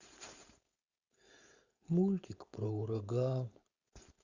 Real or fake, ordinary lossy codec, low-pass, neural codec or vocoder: fake; Opus, 64 kbps; 7.2 kHz; codec, 16 kHz, 4.8 kbps, FACodec